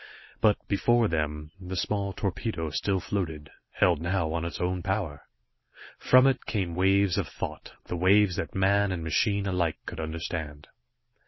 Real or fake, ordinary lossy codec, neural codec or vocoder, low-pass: real; MP3, 24 kbps; none; 7.2 kHz